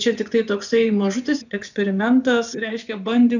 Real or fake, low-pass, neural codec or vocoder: fake; 7.2 kHz; vocoder, 24 kHz, 100 mel bands, Vocos